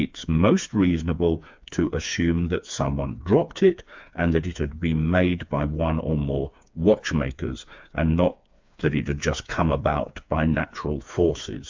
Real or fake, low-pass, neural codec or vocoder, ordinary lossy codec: fake; 7.2 kHz; codec, 16 kHz, 4 kbps, FreqCodec, smaller model; MP3, 64 kbps